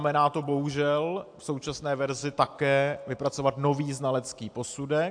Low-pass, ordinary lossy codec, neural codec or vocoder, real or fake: 9.9 kHz; AAC, 64 kbps; none; real